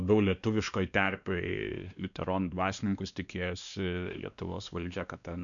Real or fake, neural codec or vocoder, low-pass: fake; codec, 16 kHz, 2 kbps, X-Codec, WavLM features, trained on Multilingual LibriSpeech; 7.2 kHz